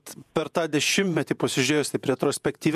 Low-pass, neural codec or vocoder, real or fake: 14.4 kHz; vocoder, 48 kHz, 128 mel bands, Vocos; fake